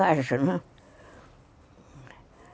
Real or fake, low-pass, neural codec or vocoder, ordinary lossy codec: real; none; none; none